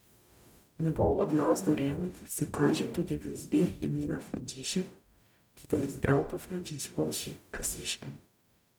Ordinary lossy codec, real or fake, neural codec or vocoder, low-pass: none; fake; codec, 44.1 kHz, 0.9 kbps, DAC; none